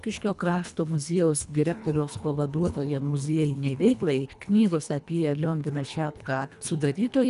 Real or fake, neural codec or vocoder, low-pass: fake; codec, 24 kHz, 1.5 kbps, HILCodec; 10.8 kHz